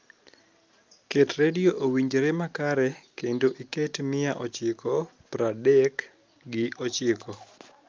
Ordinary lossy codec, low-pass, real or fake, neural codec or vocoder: Opus, 24 kbps; 7.2 kHz; real; none